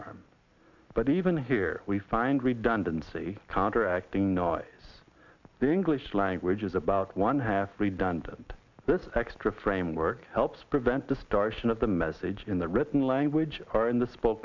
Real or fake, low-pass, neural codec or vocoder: real; 7.2 kHz; none